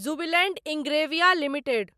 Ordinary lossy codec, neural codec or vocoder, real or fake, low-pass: MP3, 96 kbps; none; real; 14.4 kHz